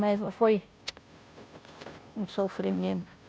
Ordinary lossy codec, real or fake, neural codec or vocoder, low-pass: none; fake; codec, 16 kHz, 0.5 kbps, FunCodec, trained on Chinese and English, 25 frames a second; none